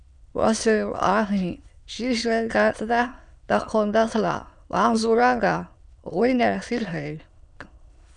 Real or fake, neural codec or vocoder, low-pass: fake; autoencoder, 22.05 kHz, a latent of 192 numbers a frame, VITS, trained on many speakers; 9.9 kHz